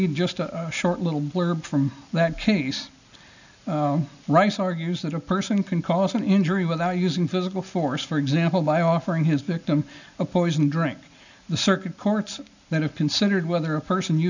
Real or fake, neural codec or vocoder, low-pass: real; none; 7.2 kHz